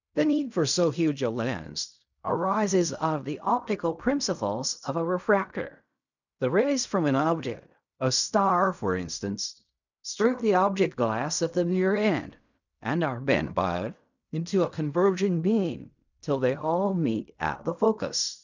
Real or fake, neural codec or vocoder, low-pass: fake; codec, 16 kHz in and 24 kHz out, 0.4 kbps, LongCat-Audio-Codec, fine tuned four codebook decoder; 7.2 kHz